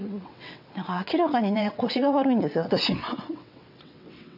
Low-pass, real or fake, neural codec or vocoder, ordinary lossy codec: 5.4 kHz; fake; vocoder, 22.05 kHz, 80 mel bands, WaveNeXt; none